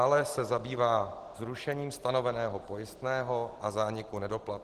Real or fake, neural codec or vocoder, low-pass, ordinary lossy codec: real; none; 14.4 kHz; Opus, 16 kbps